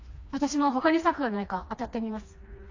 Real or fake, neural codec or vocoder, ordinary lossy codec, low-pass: fake; codec, 16 kHz, 2 kbps, FreqCodec, smaller model; AAC, 48 kbps; 7.2 kHz